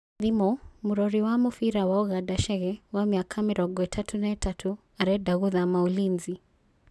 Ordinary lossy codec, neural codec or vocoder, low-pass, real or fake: none; none; none; real